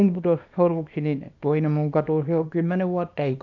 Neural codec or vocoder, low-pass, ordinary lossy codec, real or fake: codec, 24 kHz, 0.9 kbps, WavTokenizer, small release; 7.2 kHz; none; fake